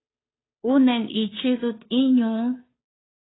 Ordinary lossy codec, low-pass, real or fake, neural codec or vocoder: AAC, 16 kbps; 7.2 kHz; fake; codec, 16 kHz, 2 kbps, FunCodec, trained on Chinese and English, 25 frames a second